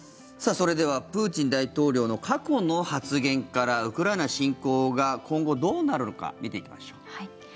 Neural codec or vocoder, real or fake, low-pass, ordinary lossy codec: none; real; none; none